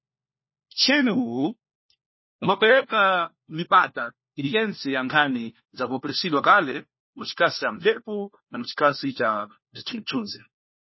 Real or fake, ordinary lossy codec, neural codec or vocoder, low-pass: fake; MP3, 24 kbps; codec, 16 kHz, 1 kbps, FunCodec, trained on LibriTTS, 50 frames a second; 7.2 kHz